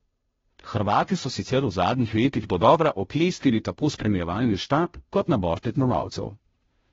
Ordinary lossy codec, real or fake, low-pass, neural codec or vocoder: AAC, 24 kbps; fake; 7.2 kHz; codec, 16 kHz, 0.5 kbps, FunCodec, trained on Chinese and English, 25 frames a second